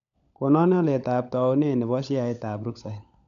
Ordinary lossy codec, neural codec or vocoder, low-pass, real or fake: none; codec, 16 kHz, 16 kbps, FunCodec, trained on LibriTTS, 50 frames a second; 7.2 kHz; fake